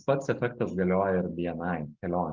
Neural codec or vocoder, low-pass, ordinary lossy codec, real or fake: none; 7.2 kHz; Opus, 32 kbps; real